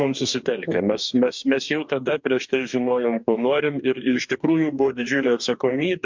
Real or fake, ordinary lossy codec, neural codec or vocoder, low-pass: fake; MP3, 64 kbps; codec, 44.1 kHz, 2.6 kbps, DAC; 7.2 kHz